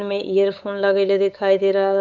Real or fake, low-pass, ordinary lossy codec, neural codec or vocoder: fake; 7.2 kHz; none; codec, 16 kHz, 8 kbps, FunCodec, trained on LibriTTS, 25 frames a second